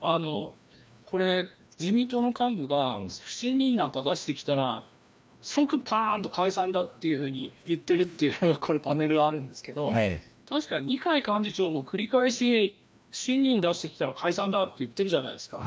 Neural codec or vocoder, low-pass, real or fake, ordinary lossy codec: codec, 16 kHz, 1 kbps, FreqCodec, larger model; none; fake; none